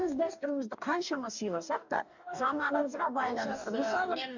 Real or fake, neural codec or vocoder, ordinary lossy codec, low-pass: fake; codec, 44.1 kHz, 2.6 kbps, DAC; none; 7.2 kHz